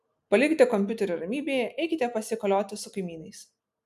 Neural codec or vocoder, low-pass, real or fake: none; 14.4 kHz; real